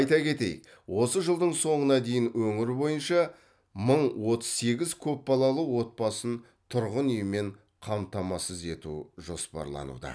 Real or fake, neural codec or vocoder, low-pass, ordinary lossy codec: real; none; none; none